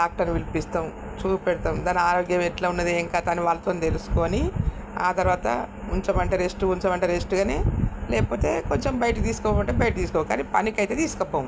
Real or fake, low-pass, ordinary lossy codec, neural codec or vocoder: real; none; none; none